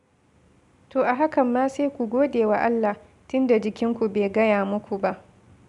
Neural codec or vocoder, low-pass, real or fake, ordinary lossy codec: none; 10.8 kHz; real; none